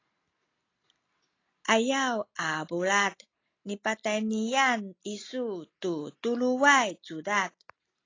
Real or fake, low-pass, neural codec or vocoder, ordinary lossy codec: real; 7.2 kHz; none; AAC, 32 kbps